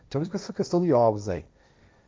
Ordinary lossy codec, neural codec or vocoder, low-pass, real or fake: none; codec, 16 kHz, 1.1 kbps, Voila-Tokenizer; none; fake